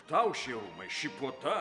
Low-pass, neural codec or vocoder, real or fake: 10.8 kHz; none; real